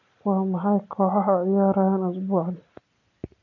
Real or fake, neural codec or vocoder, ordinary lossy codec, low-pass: real; none; none; 7.2 kHz